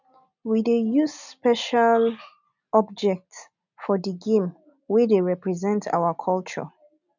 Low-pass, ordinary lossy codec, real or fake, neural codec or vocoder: 7.2 kHz; none; real; none